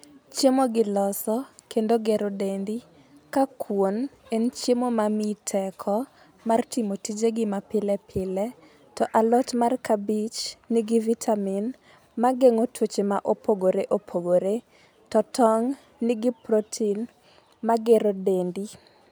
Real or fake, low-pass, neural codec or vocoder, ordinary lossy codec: real; none; none; none